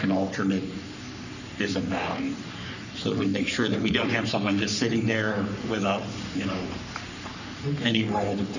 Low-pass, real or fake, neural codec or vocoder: 7.2 kHz; fake; codec, 44.1 kHz, 3.4 kbps, Pupu-Codec